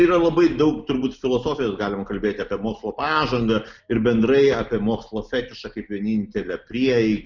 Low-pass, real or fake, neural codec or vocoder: 7.2 kHz; real; none